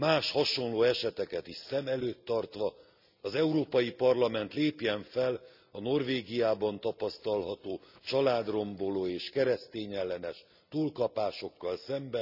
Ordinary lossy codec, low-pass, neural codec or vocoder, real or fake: none; 5.4 kHz; none; real